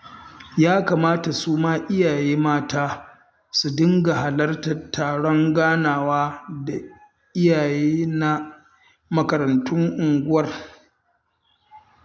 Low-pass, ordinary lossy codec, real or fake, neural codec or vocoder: none; none; real; none